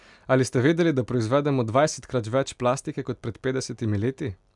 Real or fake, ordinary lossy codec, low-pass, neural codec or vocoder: real; MP3, 96 kbps; 10.8 kHz; none